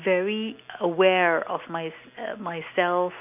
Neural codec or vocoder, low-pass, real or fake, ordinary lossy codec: none; 3.6 kHz; real; none